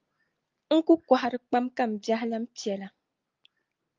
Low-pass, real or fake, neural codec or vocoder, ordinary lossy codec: 7.2 kHz; real; none; Opus, 24 kbps